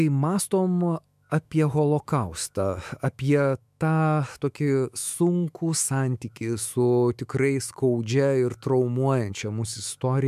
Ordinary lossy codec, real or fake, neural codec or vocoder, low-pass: MP3, 96 kbps; fake; autoencoder, 48 kHz, 128 numbers a frame, DAC-VAE, trained on Japanese speech; 14.4 kHz